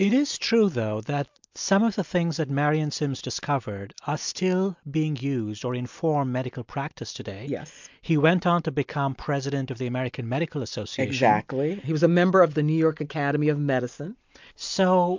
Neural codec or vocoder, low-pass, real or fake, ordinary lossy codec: none; 7.2 kHz; real; MP3, 64 kbps